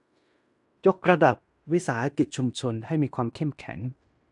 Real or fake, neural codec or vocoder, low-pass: fake; codec, 16 kHz in and 24 kHz out, 0.9 kbps, LongCat-Audio-Codec, fine tuned four codebook decoder; 10.8 kHz